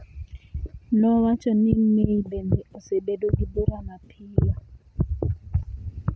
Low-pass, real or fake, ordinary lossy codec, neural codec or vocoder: none; real; none; none